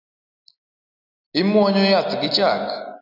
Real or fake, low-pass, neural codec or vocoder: real; 5.4 kHz; none